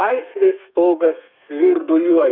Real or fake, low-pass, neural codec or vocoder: fake; 5.4 kHz; codec, 32 kHz, 1.9 kbps, SNAC